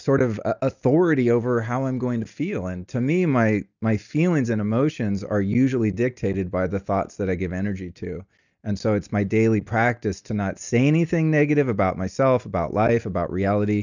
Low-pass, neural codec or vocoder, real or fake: 7.2 kHz; none; real